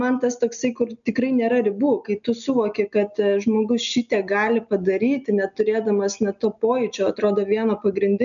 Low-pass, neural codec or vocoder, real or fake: 7.2 kHz; none; real